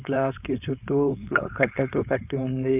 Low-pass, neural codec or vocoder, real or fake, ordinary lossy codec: 3.6 kHz; codec, 16 kHz, 4.8 kbps, FACodec; fake; none